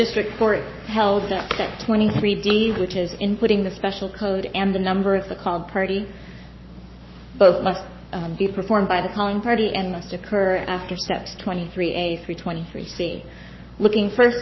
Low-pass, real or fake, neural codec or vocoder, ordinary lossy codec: 7.2 kHz; fake; codec, 44.1 kHz, 7.8 kbps, Pupu-Codec; MP3, 24 kbps